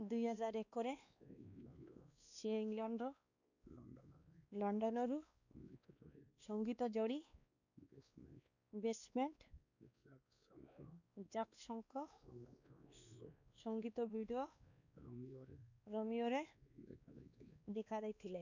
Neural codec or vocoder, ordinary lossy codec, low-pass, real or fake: codec, 16 kHz, 2 kbps, X-Codec, WavLM features, trained on Multilingual LibriSpeech; none; 7.2 kHz; fake